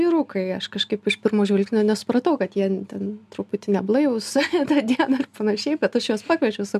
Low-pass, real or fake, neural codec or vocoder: 14.4 kHz; real; none